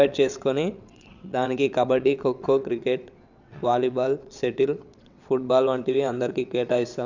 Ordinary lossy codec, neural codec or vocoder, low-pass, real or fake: none; vocoder, 22.05 kHz, 80 mel bands, WaveNeXt; 7.2 kHz; fake